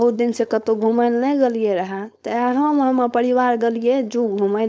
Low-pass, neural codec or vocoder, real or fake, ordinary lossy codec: none; codec, 16 kHz, 4.8 kbps, FACodec; fake; none